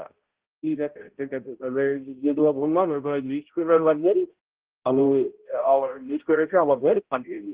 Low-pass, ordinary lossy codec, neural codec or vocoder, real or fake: 3.6 kHz; Opus, 16 kbps; codec, 16 kHz, 0.5 kbps, X-Codec, HuBERT features, trained on balanced general audio; fake